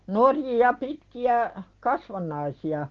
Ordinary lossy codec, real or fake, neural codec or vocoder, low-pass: Opus, 16 kbps; real; none; 7.2 kHz